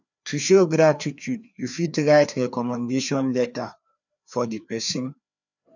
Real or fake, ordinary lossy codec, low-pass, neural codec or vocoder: fake; none; 7.2 kHz; codec, 16 kHz, 2 kbps, FreqCodec, larger model